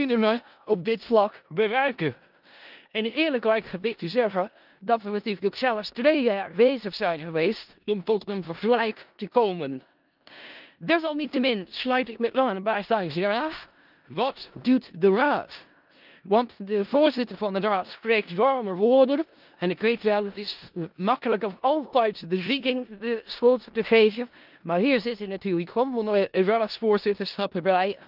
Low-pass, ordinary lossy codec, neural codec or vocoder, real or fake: 5.4 kHz; Opus, 24 kbps; codec, 16 kHz in and 24 kHz out, 0.4 kbps, LongCat-Audio-Codec, four codebook decoder; fake